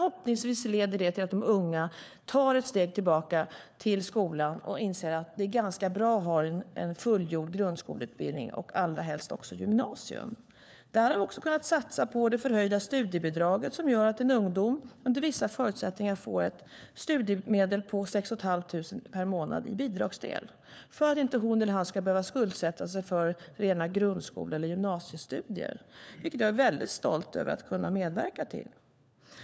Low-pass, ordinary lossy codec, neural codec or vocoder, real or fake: none; none; codec, 16 kHz, 4 kbps, FunCodec, trained on LibriTTS, 50 frames a second; fake